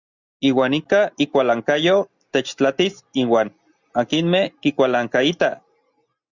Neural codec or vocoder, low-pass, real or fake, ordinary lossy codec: none; 7.2 kHz; real; Opus, 64 kbps